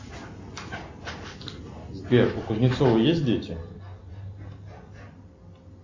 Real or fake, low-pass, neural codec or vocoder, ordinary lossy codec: real; 7.2 kHz; none; AAC, 48 kbps